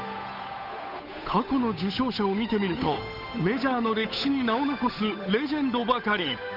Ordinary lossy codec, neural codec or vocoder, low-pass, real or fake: none; codec, 16 kHz, 8 kbps, FunCodec, trained on Chinese and English, 25 frames a second; 5.4 kHz; fake